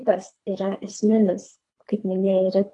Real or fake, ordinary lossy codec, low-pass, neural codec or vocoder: fake; AAC, 48 kbps; 10.8 kHz; codec, 24 kHz, 3 kbps, HILCodec